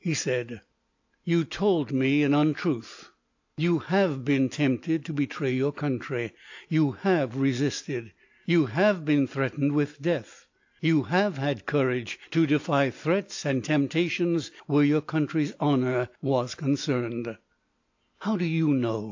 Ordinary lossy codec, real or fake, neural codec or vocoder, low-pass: AAC, 48 kbps; real; none; 7.2 kHz